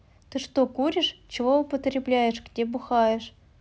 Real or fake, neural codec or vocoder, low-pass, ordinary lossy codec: real; none; none; none